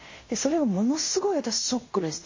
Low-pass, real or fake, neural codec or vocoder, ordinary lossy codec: 7.2 kHz; fake; codec, 16 kHz in and 24 kHz out, 0.9 kbps, LongCat-Audio-Codec, fine tuned four codebook decoder; MP3, 32 kbps